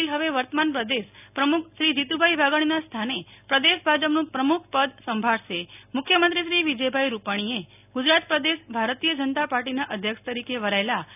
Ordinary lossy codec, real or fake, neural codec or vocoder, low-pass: none; real; none; 3.6 kHz